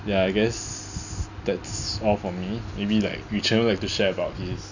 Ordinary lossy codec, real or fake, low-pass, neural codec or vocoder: none; real; 7.2 kHz; none